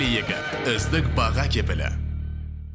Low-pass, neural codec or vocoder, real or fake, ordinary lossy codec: none; none; real; none